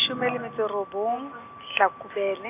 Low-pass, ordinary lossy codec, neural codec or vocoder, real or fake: 3.6 kHz; none; none; real